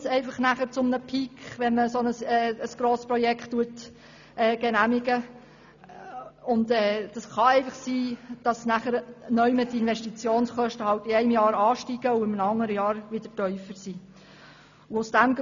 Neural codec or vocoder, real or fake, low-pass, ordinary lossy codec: none; real; 7.2 kHz; none